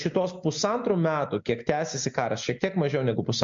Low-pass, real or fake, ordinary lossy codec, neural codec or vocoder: 7.2 kHz; real; MP3, 48 kbps; none